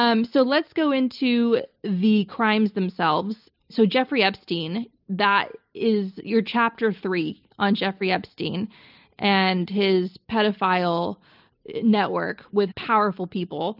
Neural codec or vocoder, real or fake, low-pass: none; real; 5.4 kHz